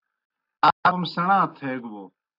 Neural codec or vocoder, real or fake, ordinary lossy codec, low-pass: none; real; AAC, 32 kbps; 5.4 kHz